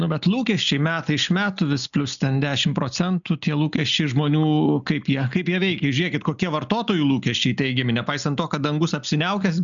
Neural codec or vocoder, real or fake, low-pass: none; real; 7.2 kHz